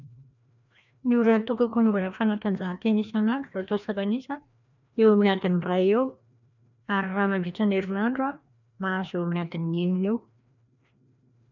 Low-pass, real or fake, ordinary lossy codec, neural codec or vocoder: 7.2 kHz; fake; none; codec, 16 kHz, 1 kbps, FreqCodec, larger model